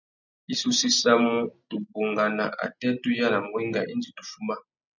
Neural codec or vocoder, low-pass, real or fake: none; 7.2 kHz; real